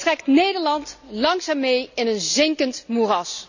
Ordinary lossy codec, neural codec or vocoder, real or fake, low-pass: none; none; real; 7.2 kHz